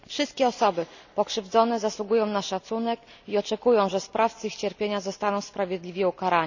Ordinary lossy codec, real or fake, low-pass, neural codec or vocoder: none; real; 7.2 kHz; none